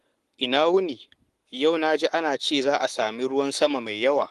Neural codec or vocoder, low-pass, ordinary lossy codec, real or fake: codec, 44.1 kHz, 7.8 kbps, Pupu-Codec; 14.4 kHz; Opus, 24 kbps; fake